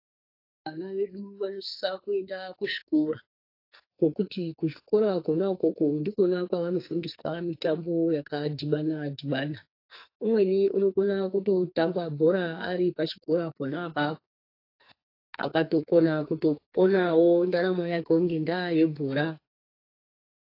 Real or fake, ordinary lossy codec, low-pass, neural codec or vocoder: fake; AAC, 32 kbps; 5.4 kHz; codec, 44.1 kHz, 2.6 kbps, SNAC